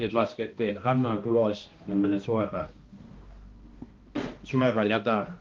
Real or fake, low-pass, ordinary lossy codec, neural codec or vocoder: fake; 7.2 kHz; Opus, 32 kbps; codec, 16 kHz, 1 kbps, X-Codec, HuBERT features, trained on balanced general audio